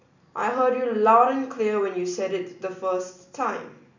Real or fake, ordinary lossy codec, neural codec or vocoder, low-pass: real; none; none; 7.2 kHz